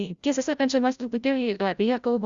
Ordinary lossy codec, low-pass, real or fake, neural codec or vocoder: Opus, 64 kbps; 7.2 kHz; fake; codec, 16 kHz, 0.5 kbps, FreqCodec, larger model